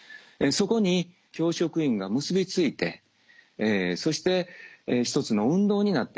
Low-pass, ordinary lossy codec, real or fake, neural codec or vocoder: none; none; real; none